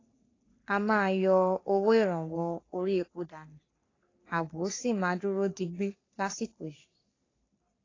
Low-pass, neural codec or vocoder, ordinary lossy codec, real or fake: 7.2 kHz; codec, 44.1 kHz, 3.4 kbps, Pupu-Codec; AAC, 32 kbps; fake